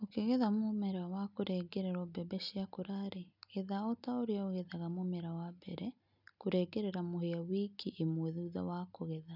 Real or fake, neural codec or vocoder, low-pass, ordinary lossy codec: real; none; 5.4 kHz; none